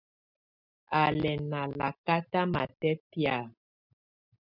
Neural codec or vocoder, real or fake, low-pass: none; real; 5.4 kHz